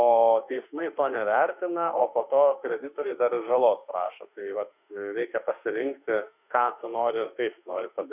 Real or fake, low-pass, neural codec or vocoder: fake; 3.6 kHz; autoencoder, 48 kHz, 32 numbers a frame, DAC-VAE, trained on Japanese speech